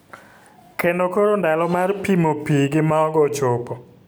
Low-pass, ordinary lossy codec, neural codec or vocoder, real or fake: none; none; none; real